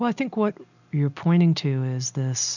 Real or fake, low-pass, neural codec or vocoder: real; 7.2 kHz; none